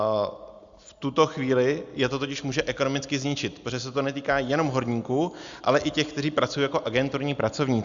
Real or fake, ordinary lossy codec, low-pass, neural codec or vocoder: real; Opus, 64 kbps; 7.2 kHz; none